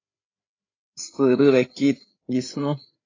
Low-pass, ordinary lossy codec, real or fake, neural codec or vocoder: 7.2 kHz; AAC, 32 kbps; fake; codec, 16 kHz, 8 kbps, FreqCodec, larger model